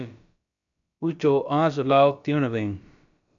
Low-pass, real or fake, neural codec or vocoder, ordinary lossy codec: 7.2 kHz; fake; codec, 16 kHz, about 1 kbps, DyCAST, with the encoder's durations; MP3, 64 kbps